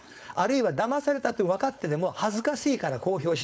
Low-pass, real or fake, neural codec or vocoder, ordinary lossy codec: none; fake; codec, 16 kHz, 4.8 kbps, FACodec; none